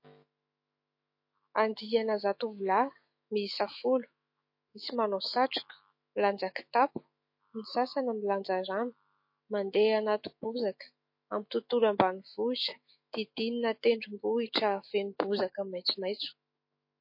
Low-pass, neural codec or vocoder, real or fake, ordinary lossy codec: 5.4 kHz; autoencoder, 48 kHz, 128 numbers a frame, DAC-VAE, trained on Japanese speech; fake; MP3, 32 kbps